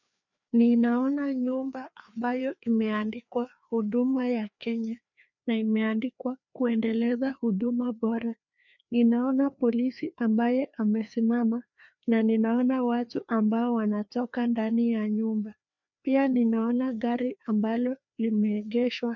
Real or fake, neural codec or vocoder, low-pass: fake; codec, 16 kHz, 2 kbps, FreqCodec, larger model; 7.2 kHz